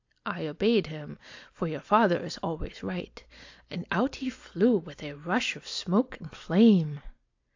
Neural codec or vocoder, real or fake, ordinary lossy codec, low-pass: none; real; AAC, 48 kbps; 7.2 kHz